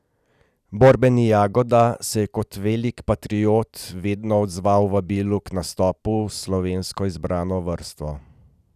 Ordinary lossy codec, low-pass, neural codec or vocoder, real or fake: none; 14.4 kHz; none; real